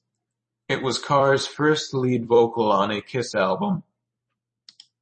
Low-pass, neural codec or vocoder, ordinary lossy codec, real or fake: 10.8 kHz; vocoder, 44.1 kHz, 128 mel bands every 256 samples, BigVGAN v2; MP3, 32 kbps; fake